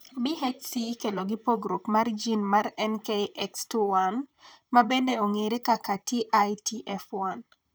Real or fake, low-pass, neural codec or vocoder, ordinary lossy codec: fake; none; vocoder, 44.1 kHz, 128 mel bands, Pupu-Vocoder; none